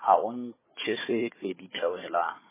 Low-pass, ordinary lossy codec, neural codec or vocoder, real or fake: 3.6 kHz; MP3, 16 kbps; codec, 16 kHz, 4 kbps, FunCodec, trained on Chinese and English, 50 frames a second; fake